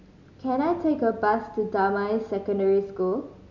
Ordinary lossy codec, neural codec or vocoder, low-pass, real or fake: MP3, 64 kbps; none; 7.2 kHz; real